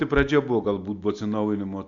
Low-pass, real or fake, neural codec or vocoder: 7.2 kHz; real; none